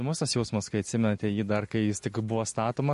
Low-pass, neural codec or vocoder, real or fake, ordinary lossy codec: 14.4 kHz; vocoder, 44.1 kHz, 128 mel bands every 256 samples, BigVGAN v2; fake; MP3, 48 kbps